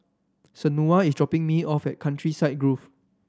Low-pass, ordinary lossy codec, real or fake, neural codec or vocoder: none; none; real; none